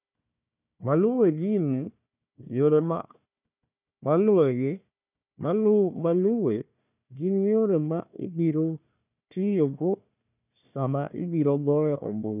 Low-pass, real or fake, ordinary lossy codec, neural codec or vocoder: 3.6 kHz; fake; none; codec, 16 kHz, 1 kbps, FunCodec, trained on Chinese and English, 50 frames a second